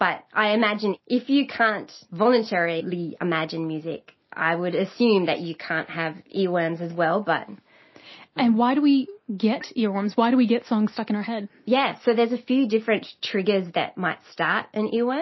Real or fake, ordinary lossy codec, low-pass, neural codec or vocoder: real; MP3, 24 kbps; 7.2 kHz; none